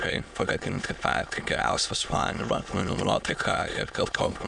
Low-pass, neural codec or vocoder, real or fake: 9.9 kHz; autoencoder, 22.05 kHz, a latent of 192 numbers a frame, VITS, trained on many speakers; fake